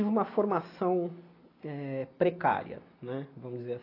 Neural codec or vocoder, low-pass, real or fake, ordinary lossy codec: none; 5.4 kHz; real; AAC, 24 kbps